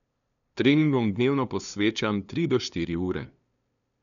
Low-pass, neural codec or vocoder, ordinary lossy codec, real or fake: 7.2 kHz; codec, 16 kHz, 2 kbps, FunCodec, trained on LibriTTS, 25 frames a second; none; fake